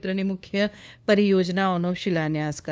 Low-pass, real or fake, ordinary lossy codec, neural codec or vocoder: none; fake; none; codec, 16 kHz, 2 kbps, FunCodec, trained on LibriTTS, 25 frames a second